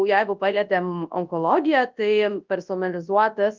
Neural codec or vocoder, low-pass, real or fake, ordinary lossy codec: codec, 24 kHz, 0.9 kbps, WavTokenizer, large speech release; 7.2 kHz; fake; Opus, 32 kbps